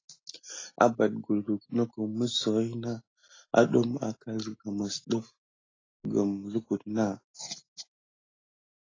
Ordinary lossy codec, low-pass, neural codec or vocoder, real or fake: AAC, 32 kbps; 7.2 kHz; none; real